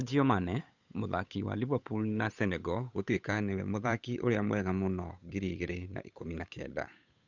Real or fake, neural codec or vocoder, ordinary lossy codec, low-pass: fake; codec, 16 kHz, 8 kbps, FunCodec, trained on Chinese and English, 25 frames a second; none; 7.2 kHz